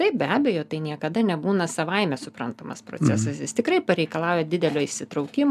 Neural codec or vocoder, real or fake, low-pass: none; real; 14.4 kHz